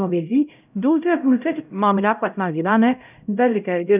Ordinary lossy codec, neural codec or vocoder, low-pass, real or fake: none; codec, 16 kHz, 0.5 kbps, X-Codec, HuBERT features, trained on LibriSpeech; 3.6 kHz; fake